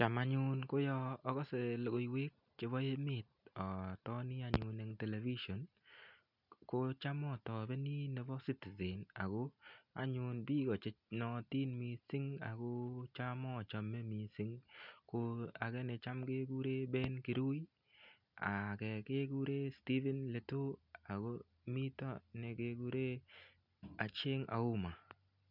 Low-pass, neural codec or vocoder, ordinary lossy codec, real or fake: 5.4 kHz; none; none; real